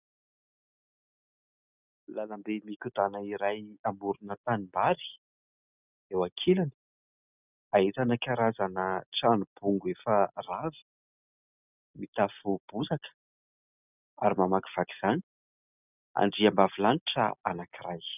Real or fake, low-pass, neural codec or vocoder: real; 3.6 kHz; none